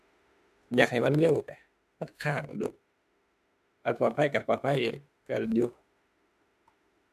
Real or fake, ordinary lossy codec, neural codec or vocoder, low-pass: fake; MP3, 96 kbps; autoencoder, 48 kHz, 32 numbers a frame, DAC-VAE, trained on Japanese speech; 14.4 kHz